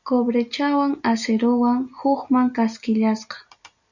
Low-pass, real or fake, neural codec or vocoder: 7.2 kHz; real; none